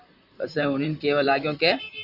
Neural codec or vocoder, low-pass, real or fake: vocoder, 44.1 kHz, 128 mel bands, Pupu-Vocoder; 5.4 kHz; fake